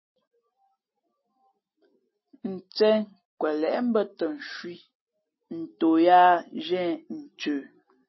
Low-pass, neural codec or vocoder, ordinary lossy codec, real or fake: 7.2 kHz; none; MP3, 24 kbps; real